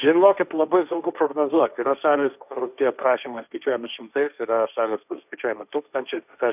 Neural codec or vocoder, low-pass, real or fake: codec, 16 kHz, 1.1 kbps, Voila-Tokenizer; 3.6 kHz; fake